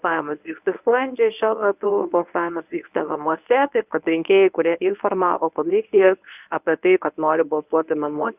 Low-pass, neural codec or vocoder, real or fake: 3.6 kHz; codec, 24 kHz, 0.9 kbps, WavTokenizer, medium speech release version 1; fake